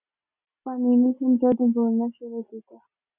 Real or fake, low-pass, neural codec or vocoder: real; 3.6 kHz; none